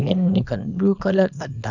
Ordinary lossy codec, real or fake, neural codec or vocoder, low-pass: none; fake; codec, 24 kHz, 0.9 kbps, WavTokenizer, small release; 7.2 kHz